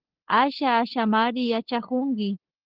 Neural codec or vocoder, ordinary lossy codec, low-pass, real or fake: codec, 16 kHz, 8 kbps, FunCodec, trained on LibriTTS, 25 frames a second; Opus, 16 kbps; 5.4 kHz; fake